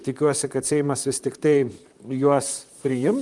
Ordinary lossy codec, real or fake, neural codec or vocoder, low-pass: Opus, 32 kbps; fake; vocoder, 44.1 kHz, 128 mel bands, Pupu-Vocoder; 10.8 kHz